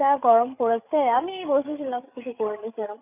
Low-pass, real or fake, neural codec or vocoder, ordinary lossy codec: 3.6 kHz; fake; codec, 16 kHz, 8 kbps, FreqCodec, larger model; none